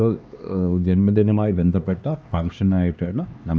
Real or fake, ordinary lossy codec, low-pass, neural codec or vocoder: fake; none; none; codec, 16 kHz, 2 kbps, X-Codec, HuBERT features, trained on LibriSpeech